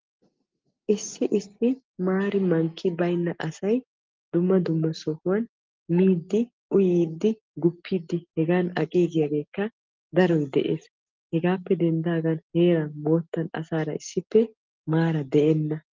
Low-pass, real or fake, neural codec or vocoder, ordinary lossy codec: 7.2 kHz; real; none; Opus, 24 kbps